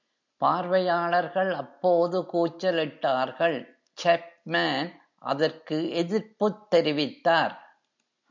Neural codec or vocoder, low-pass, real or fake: none; 7.2 kHz; real